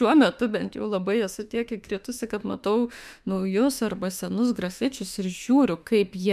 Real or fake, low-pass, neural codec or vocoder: fake; 14.4 kHz; autoencoder, 48 kHz, 32 numbers a frame, DAC-VAE, trained on Japanese speech